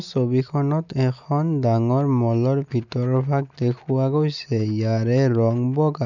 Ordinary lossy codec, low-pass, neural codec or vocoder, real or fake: none; 7.2 kHz; none; real